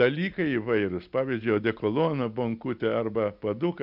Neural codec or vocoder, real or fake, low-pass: none; real; 5.4 kHz